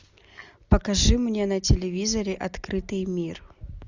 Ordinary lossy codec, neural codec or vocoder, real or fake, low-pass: Opus, 64 kbps; none; real; 7.2 kHz